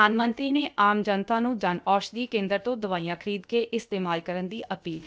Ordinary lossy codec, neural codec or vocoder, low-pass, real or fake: none; codec, 16 kHz, about 1 kbps, DyCAST, with the encoder's durations; none; fake